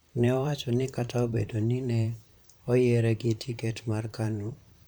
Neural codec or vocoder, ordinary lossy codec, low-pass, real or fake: vocoder, 44.1 kHz, 128 mel bands, Pupu-Vocoder; none; none; fake